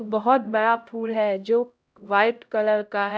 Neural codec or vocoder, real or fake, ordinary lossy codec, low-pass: codec, 16 kHz, 0.5 kbps, X-Codec, HuBERT features, trained on LibriSpeech; fake; none; none